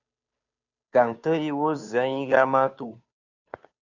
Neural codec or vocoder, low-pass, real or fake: codec, 16 kHz, 2 kbps, FunCodec, trained on Chinese and English, 25 frames a second; 7.2 kHz; fake